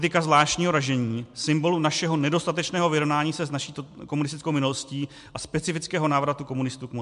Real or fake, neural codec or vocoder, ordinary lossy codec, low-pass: real; none; MP3, 64 kbps; 10.8 kHz